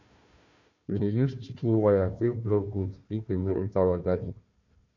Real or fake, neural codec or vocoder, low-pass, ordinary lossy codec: fake; codec, 16 kHz, 1 kbps, FunCodec, trained on Chinese and English, 50 frames a second; 7.2 kHz; none